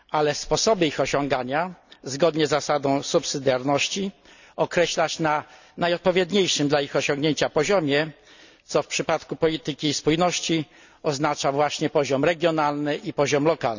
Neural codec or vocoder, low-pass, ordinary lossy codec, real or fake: none; 7.2 kHz; none; real